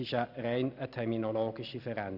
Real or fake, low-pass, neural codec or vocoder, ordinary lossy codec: real; 5.4 kHz; none; none